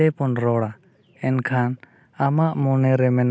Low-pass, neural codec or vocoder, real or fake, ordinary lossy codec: none; none; real; none